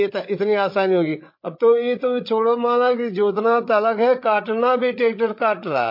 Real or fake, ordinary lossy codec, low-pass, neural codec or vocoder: fake; MP3, 32 kbps; 5.4 kHz; codec, 16 kHz, 8 kbps, FreqCodec, larger model